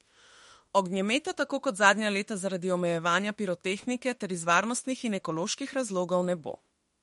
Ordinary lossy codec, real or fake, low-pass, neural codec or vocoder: MP3, 48 kbps; fake; 19.8 kHz; autoencoder, 48 kHz, 32 numbers a frame, DAC-VAE, trained on Japanese speech